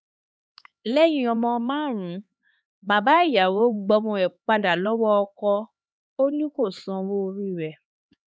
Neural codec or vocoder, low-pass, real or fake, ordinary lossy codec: codec, 16 kHz, 4 kbps, X-Codec, HuBERT features, trained on LibriSpeech; none; fake; none